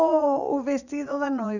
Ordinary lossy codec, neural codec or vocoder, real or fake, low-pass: none; vocoder, 22.05 kHz, 80 mel bands, Vocos; fake; 7.2 kHz